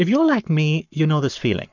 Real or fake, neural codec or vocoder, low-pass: fake; codec, 44.1 kHz, 7.8 kbps, Pupu-Codec; 7.2 kHz